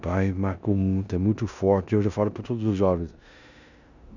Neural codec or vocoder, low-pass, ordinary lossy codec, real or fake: codec, 16 kHz in and 24 kHz out, 0.9 kbps, LongCat-Audio-Codec, four codebook decoder; 7.2 kHz; none; fake